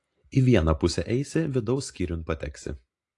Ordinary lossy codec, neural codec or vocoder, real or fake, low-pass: AAC, 48 kbps; none; real; 10.8 kHz